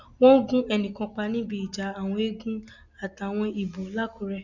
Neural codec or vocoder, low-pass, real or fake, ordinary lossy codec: none; 7.2 kHz; real; none